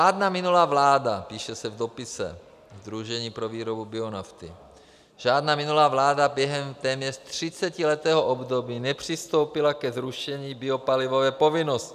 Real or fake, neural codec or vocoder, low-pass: real; none; 14.4 kHz